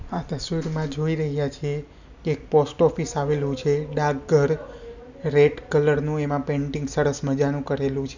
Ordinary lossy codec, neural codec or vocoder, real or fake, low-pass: none; none; real; 7.2 kHz